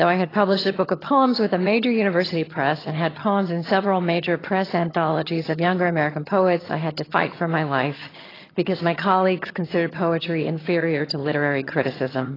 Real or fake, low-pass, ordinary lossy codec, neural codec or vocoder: fake; 5.4 kHz; AAC, 24 kbps; vocoder, 22.05 kHz, 80 mel bands, HiFi-GAN